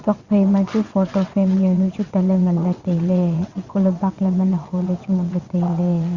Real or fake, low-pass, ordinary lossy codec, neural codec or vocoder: fake; 7.2 kHz; Opus, 64 kbps; vocoder, 22.05 kHz, 80 mel bands, WaveNeXt